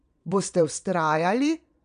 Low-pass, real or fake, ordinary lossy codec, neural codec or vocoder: 9.9 kHz; fake; none; vocoder, 22.05 kHz, 80 mel bands, Vocos